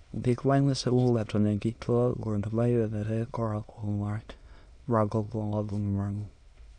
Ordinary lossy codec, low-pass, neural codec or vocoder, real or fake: none; 9.9 kHz; autoencoder, 22.05 kHz, a latent of 192 numbers a frame, VITS, trained on many speakers; fake